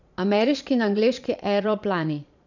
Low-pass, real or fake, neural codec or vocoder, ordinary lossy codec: 7.2 kHz; fake; vocoder, 22.05 kHz, 80 mel bands, Vocos; none